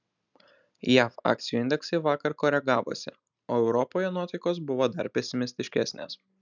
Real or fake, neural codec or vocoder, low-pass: real; none; 7.2 kHz